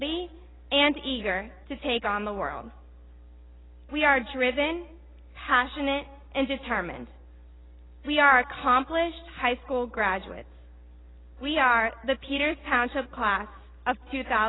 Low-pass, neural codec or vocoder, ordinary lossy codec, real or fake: 7.2 kHz; none; AAC, 16 kbps; real